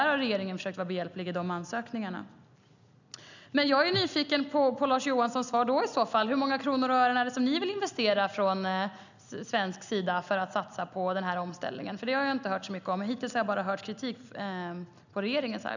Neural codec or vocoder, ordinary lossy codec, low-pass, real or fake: none; none; 7.2 kHz; real